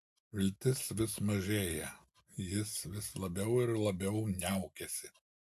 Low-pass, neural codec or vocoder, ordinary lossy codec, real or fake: 14.4 kHz; none; AAC, 96 kbps; real